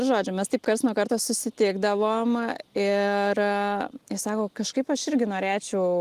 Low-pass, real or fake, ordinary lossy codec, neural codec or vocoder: 14.4 kHz; real; Opus, 24 kbps; none